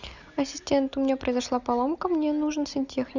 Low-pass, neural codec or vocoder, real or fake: 7.2 kHz; none; real